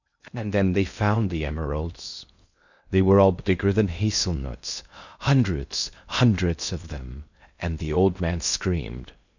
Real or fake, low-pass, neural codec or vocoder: fake; 7.2 kHz; codec, 16 kHz in and 24 kHz out, 0.6 kbps, FocalCodec, streaming, 2048 codes